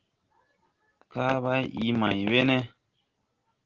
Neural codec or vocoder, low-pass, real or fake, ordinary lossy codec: none; 7.2 kHz; real; Opus, 16 kbps